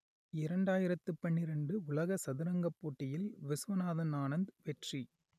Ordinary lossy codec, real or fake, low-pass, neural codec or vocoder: none; real; 14.4 kHz; none